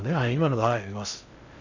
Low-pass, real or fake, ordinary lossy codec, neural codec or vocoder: 7.2 kHz; fake; none; codec, 16 kHz in and 24 kHz out, 0.6 kbps, FocalCodec, streaming, 2048 codes